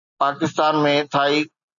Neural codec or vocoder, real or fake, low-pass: none; real; 7.2 kHz